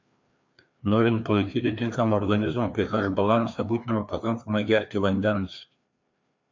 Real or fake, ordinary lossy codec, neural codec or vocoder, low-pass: fake; MP3, 48 kbps; codec, 16 kHz, 2 kbps, FreqCodec, larger model; 7.2 kHz